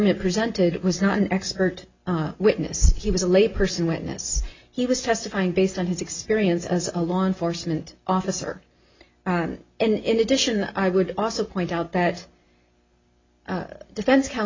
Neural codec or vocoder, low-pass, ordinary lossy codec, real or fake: none; 7.2 kHz; MP3, 64 kbps; real